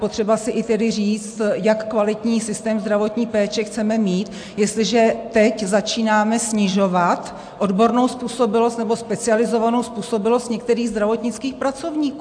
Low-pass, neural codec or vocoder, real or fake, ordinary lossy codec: 9.9 kHz; none; real; AAC, 64 kbps